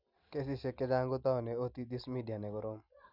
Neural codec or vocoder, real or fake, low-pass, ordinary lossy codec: none; real; 5.4 kHz; none